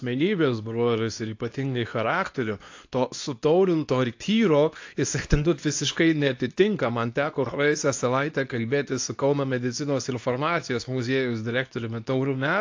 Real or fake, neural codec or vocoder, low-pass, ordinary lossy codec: fake; codec, 24 kHz, 0.9 kbps, WavTokenizer, medium speech release version 2; 7.2 kHz; AAC, 48 kbps